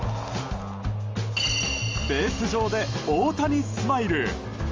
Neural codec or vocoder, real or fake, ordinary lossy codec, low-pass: none; real; Opus, 32 kbps; 7.2 kHz